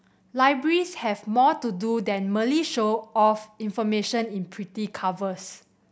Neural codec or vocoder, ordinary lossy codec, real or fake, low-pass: none; none; real; none